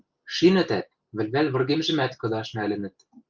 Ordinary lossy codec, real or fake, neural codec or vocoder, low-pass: Opus, 24 kbps; real; none; 7.2 kHz